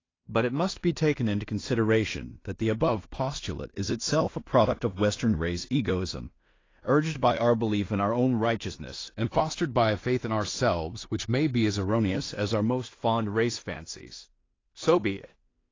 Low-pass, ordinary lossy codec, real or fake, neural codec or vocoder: 7.2 kHz; AAC, 32 kbps; fake; codec, 16 kHz in and 24 kHz out, 0.4 kbps, LongCat-Audio-Codec, two codebook decoder